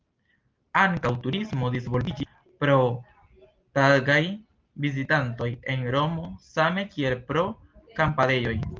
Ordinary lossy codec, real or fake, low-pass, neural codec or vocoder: Opus, 32 kbps; real; 7.2 kHz; none